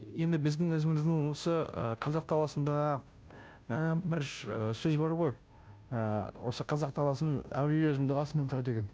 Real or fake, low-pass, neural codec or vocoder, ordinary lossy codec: fake; none; codec, 16 kHz, 0.5 kbps, FunCodec, trained on Chinese and English, 25 frames a second; none